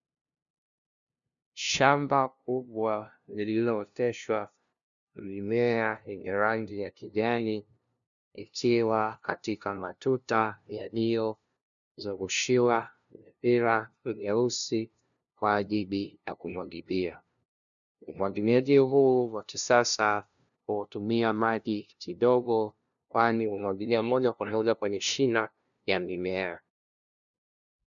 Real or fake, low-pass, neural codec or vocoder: fake; 7.2 kHz; codec, 16 kHz, 0.5 kbps, FunCodec, trained on LibriTTS, 25 frames a second